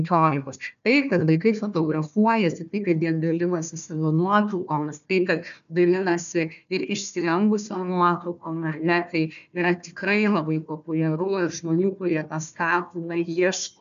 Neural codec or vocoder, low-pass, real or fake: codec, 16 kHz, 1 kbps, FunCodec, trained on Chinese and English, 50 frames a second; 7.2 kHz; fake